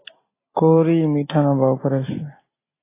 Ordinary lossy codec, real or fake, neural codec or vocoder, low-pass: AAC, 16 kbps; real; none; 3.6 kHz